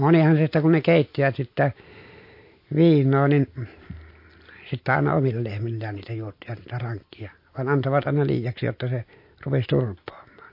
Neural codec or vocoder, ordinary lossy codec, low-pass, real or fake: none; MP3, 32 kbps; 5.4 kHz; real